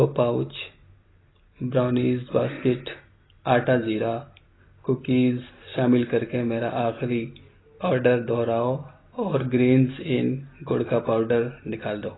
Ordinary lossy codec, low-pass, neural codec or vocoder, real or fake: AAC, 16 kbps; 7.2 kHz; none; real